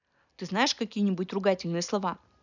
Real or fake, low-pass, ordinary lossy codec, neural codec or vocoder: real; 7.2 kHz; none; none